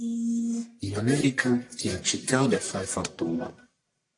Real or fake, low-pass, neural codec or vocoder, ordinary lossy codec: fake; 10.8 kHz; codec, 44.1 kHz, 1.7 kbps, Pupu-Codec; AAC, 64 kbps